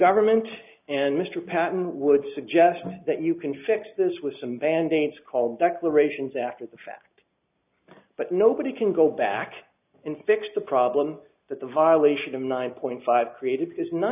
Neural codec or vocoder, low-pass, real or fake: none; 3.6 kHz; real